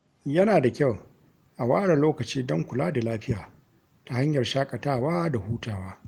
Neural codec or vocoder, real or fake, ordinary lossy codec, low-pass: none; real; Opus, 24 kbps; 19.8 kHz